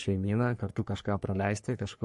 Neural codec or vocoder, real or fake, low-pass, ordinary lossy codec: codec, 44.1 kHz, 3.4 kbps, Pupu-Codec; fake; 14.4 kHz; MP3, 48 kbps